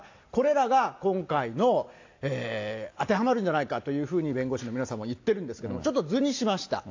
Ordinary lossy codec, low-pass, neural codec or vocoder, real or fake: AAC, 48 kbps; 7.2 kHz; none; real